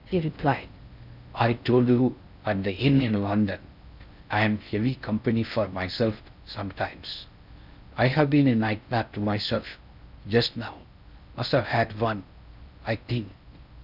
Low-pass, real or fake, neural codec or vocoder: 5.4 kHz; fake; codec, 16 kHz in and 24 kHz out, 0.6 kbps, FocalCodec, streaming, 4096 codes